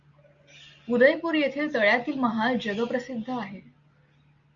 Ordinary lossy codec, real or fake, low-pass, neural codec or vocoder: Opus, 64 kbps; real; 7.2 kHz; none